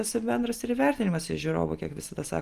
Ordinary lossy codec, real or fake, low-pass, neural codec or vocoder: Opus, 32 kbps; real; 14.4 kHz; none